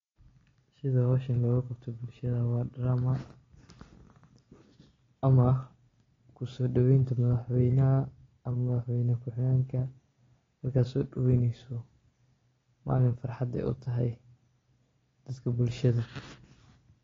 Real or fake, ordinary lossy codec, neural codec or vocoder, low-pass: real; AAC, 32 kbps; none; 7.2 kHz